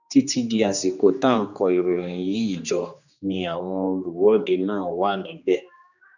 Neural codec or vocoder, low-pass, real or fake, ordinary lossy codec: codec, 16 kHz, 2 kbps, X-Codec, HuBERT features, trained on general audio; 7.2 kHz; fake; none